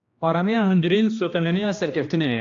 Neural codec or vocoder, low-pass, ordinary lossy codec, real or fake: codec, 16 kHz, 1 kbps, X-Codec, HuBERT features, trained on general audio; 7.2 kHz; AAC, 48 kbps; fake